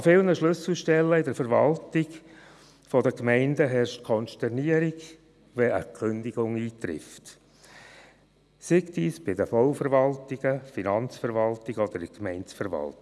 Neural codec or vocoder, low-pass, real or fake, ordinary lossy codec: none; none; real; none